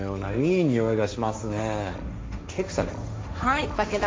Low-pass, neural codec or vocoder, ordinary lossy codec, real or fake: none; codec, 16 kHz, 1.1 kbps, Voila-Tokenizer; none; fake